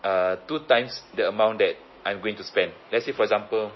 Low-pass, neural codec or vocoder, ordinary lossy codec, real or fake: 7.2 kHz; none; MP3, 24 kbps; real